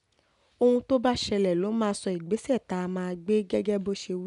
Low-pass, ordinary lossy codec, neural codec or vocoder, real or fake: 10.8 kHz; none; none; real